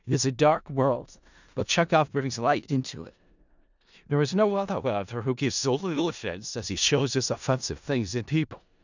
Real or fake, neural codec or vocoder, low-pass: fake; codec, 16 kHz in and 24 kHz out, 0.4 kbps, LongCat-Audio-Codec, four codebook decoder; 7.2 kHz